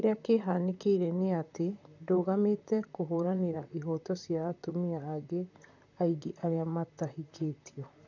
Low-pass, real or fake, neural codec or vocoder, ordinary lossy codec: 7.2 kHz; fake; vocoder, 44.1 kHz, 128 mel bands, Pupu-Vocoder; none